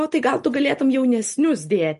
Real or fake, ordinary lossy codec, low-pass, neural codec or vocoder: real; MP3, 48 kbps; 14.4 kHz; none